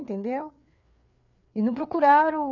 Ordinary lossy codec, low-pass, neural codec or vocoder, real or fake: none; 7.2 kHz; codec, 16 kHz, 4 kbps, FreqCodec, larger model; fake